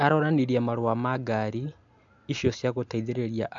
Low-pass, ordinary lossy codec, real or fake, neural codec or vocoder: 7.2 kHz; AAC, 64 kbps; real; none